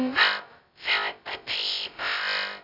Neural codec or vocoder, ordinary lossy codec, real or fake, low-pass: codec, 16 kHz, 0.2 kbps, FocalCodec; none; fake; 5.4 kHz